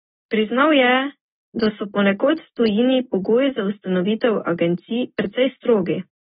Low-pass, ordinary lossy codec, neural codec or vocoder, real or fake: 19.8 kHz; AAC, 16 kbps; none; real